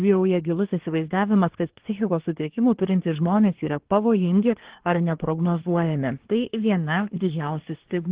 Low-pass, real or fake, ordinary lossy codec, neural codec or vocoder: 3.6 kHz; fake; Opus, 16 kbps; codec, 24 kHz, 1 kbps, SNAC